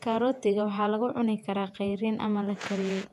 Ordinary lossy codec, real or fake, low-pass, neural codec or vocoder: AAC, 96 kbps; fake; 14.4 kHz; vocoder, 48 kHz, 128 mel bands, Vocos